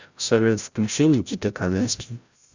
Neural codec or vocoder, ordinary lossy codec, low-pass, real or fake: codec, 16 kHz, 0.5 kbps, FreqCodec, larger model; Opus, 64 kbps; 7.2 kHz; fake